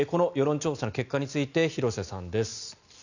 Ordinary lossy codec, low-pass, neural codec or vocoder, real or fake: none; 7.2 kHz; none; real